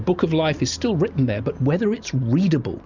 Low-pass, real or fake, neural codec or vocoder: 7.2 kHz; real; none